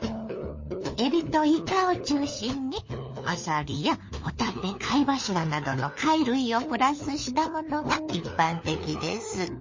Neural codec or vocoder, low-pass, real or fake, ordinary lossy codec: codec, 16 kHz, 4 kbps, FunCodec, trained on LibriTTS, 50 frames a second; 7.2 kHz; fake; MP3, 32 kbps